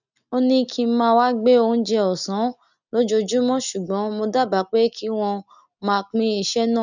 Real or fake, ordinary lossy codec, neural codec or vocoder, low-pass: real; none; none; 7.2 kHz